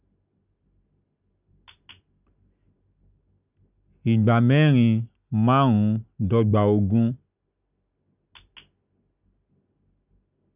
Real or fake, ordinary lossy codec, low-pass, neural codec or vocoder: real; none; 3.6 kHz; none